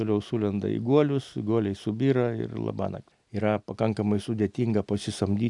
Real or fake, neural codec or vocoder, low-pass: real; none; 10.8 kHz